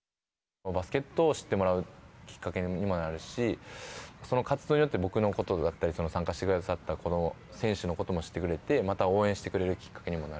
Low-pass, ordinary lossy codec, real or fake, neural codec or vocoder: none; none; real; none